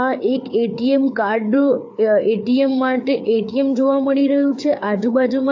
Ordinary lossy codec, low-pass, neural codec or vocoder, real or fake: AAC, 48 kbps; 7.2 kHz; codec, 16 kHz, 4 kbps, FreqCodec, larger model; fake